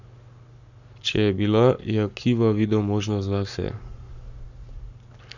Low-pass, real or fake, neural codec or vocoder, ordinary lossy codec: 7.2 kHz; fake; codec, 44.1 kHz, 7.8 kbps, Pupu-Codec; none